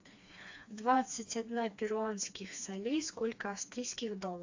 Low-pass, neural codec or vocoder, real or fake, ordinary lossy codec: 7.2 kHz; codec, 16 kHz, 2 kbps, FreqCodec, smaller model; fake; AAC, 48 kbps